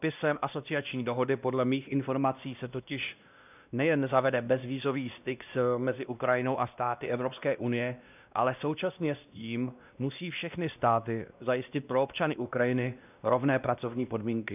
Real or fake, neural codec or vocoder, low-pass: fake; codec, 16 kHz, 1 kbps, X-Codec, WavLM features, trained on Multilingual LibriSpeech; 3.6 kHz